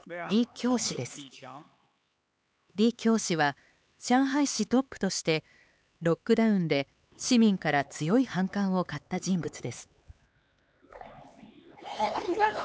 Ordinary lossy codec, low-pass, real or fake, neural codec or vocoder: none; none; fake; codec, 16 kHz, 4 kbps, X-Codec, HuBERT features, trained on LibriSpeech